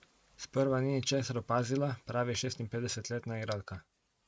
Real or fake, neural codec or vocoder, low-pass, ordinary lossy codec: real; none; none; none